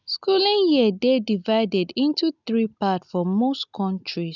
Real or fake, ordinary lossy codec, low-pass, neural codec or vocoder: real; none; 7.2 kHz; none